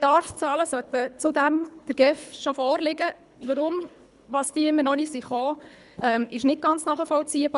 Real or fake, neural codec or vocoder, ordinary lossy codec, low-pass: fake; codec, 24 kHz, 3 kbps, HILCodec; none; 10.8 kHz